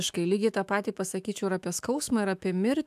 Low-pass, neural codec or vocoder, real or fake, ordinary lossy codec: 14.4 kHz; autoencoder, 48 kHz, 128 numbers a frame, DAC-VAE, trained on Japanese speech; fake; AAC, 96 kbps